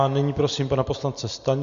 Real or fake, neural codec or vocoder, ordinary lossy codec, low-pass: real; none; Opus, 64 kbps; 7.2 kHz